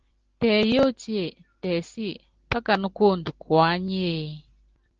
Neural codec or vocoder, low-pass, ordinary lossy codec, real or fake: none; 7.2 kHz; Opus, 16 kbps; real